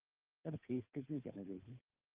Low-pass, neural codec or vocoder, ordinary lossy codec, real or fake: 3.6 kHz; codec, 16 kHz, 2 kbps, FunCodec, trained on Chinese and English, 25 frames a second; Opus, 24 kbps; fake